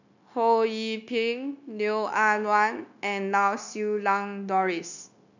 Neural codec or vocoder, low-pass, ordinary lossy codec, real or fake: codec, 16 kHz, 0.9 kbps, LongCat-Audio-Codec; 7.2 kHz; none; fake